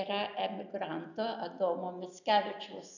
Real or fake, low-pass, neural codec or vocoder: real; 7.2 kHz; none